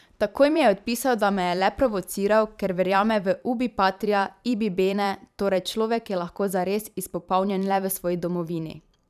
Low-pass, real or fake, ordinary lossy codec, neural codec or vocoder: 14.4 kHz; fake; none; vocoder, 44.1 kHz, 128 mel bands every 512 samples, BigVGAN v2